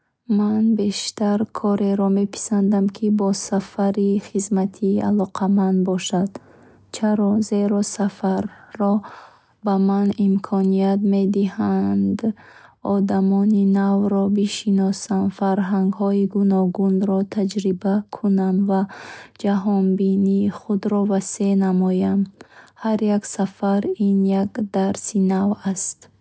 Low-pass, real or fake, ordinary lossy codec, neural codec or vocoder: none; real; none; none